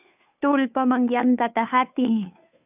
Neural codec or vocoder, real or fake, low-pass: codec, 16 kHz, 2 kbps, FunCodec, trained on Chinese and English, 25 frames a second; fake; 3.6 kHz